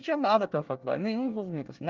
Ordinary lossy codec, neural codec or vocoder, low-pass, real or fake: Opus, 32 kbps; codec, 24 kHz, 1 kbps, SNAC; 7.2 kHz; fake